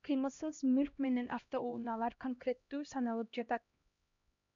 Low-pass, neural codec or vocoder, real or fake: 7.2 kHz; codec, 16 kHz, 1 kbps, X-Codec, HuBERT features, trained on LibriSpeech; fake